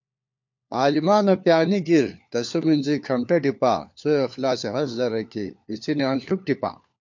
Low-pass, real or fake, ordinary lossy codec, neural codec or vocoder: 7.2 kHz; fake; MP3, 48 kbps; codec, 16 kHz, 4 kbps, FunCodec, trained on LibriTTS, 50 frames a second